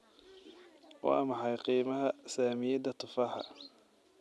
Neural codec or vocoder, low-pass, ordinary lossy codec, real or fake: none; none; none; real